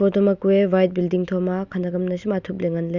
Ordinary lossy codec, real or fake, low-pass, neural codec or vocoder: Opus, 64 kbps; real; 7.2 kHz; none